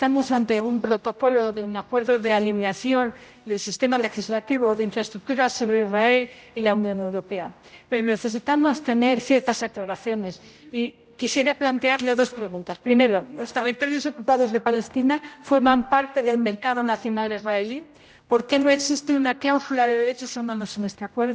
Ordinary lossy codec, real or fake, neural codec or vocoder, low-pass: none; fake; codec, 16 kHz, 0.5 kbps, X-Codec, HuBERT features, trained on general audio; none